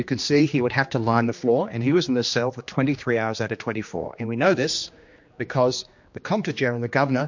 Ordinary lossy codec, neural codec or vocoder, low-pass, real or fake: MP3, 48 kbps; codec, 16 kHz, 2 kbps, X-Codec, HuBERT features, trained on general audio; 7.2 kHz; fake